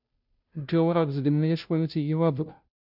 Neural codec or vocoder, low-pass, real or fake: codec, 16 kHz, 0.5 kbps, FunCodec, trained on Chinese and English, 25 frames a second; 5.4 kHz; fake